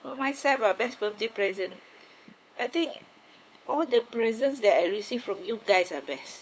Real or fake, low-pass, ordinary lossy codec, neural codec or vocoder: fake; none; none; codec, 16 kHz, 8 kbps, FunCodec, trained on LibriTTS, 25 frames a second